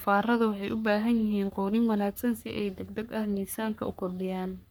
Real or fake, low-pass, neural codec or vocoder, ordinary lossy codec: fake; none; codec, 44.1 kHz, 3.4 kbps, Pupu-Codec; none